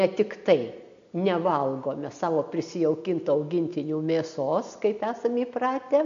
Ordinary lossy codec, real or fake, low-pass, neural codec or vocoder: MP3, 64 kbps; real; 7.2 kHz; none